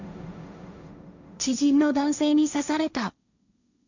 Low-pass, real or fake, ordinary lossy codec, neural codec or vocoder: 7.2 kHz; fake; none; codec, 16 kHz, 1.1 kbps, Voila-Tokenizer